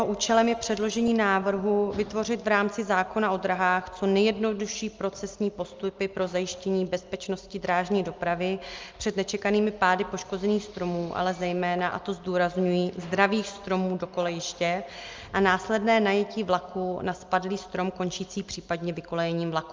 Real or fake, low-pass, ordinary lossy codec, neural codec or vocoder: real; 7.2 kHz; Opus, 24 kbps; none